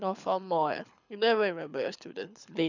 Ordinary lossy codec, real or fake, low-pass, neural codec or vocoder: none; fake; 7.2 kHz; codec, 24 kHz, 6 kbps, HILCodec